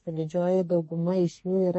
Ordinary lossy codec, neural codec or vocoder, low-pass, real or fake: MP3, 32 kbps; codec, 44.1 kHz, 2.6 kbps, SNAC; 10.8 kHz; fake